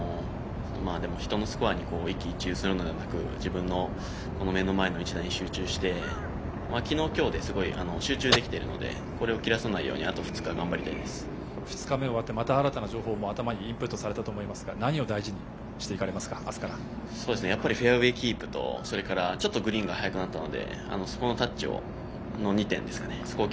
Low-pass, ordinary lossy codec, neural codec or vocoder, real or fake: none; none; none; real